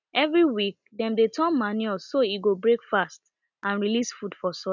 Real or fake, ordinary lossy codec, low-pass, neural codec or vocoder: real; none; 7.2 kHz; none